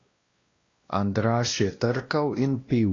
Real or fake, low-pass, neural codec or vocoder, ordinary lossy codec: fake; 7.2 kHz; codec, 16 kHz, 1 kbps, X-Codec, WavLM features, trained on Multilingual LibriSpeech; AAC, 32 kbps